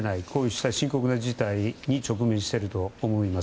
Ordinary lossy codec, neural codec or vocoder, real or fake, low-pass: none; none; real; none